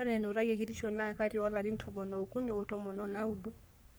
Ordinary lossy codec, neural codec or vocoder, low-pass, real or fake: none; codec, 44.1 kHz, 3.4 kbps, Pupu-Codec; none; fake